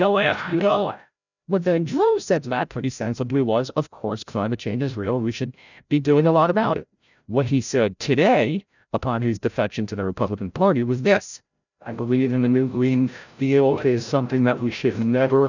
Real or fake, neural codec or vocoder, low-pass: fake; codec, 16 kHz, 0.5 kbps, FreqCodec, larger model; 7.2 kHz